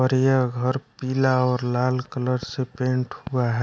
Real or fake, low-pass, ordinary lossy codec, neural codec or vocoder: real; none; none; none